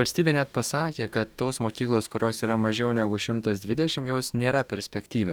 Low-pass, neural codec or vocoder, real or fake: 19.8 kHz; codec, 44.1 kHz, 2.6 kbps, DAC; fake